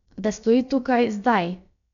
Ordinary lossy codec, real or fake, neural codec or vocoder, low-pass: none; fake; codec, 16 kHz, about 1 kbps, DyCAST, with the encoder's durations; 7.2 kHz